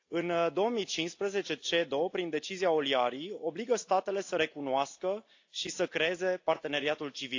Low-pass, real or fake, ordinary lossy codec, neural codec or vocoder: 7.2 kHz; real; AAC, 48 kbps; none